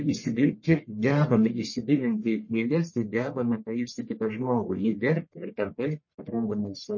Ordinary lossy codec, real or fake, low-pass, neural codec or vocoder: MP3, 32 kbps; fake; 7.2 kHz; codec, 44.1 kHz, 1.7 kbps, Pupu-Codec